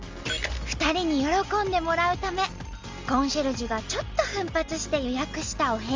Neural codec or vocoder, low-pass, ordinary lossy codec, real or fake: none; 7.2 kHz; Opus, 32 kbps; real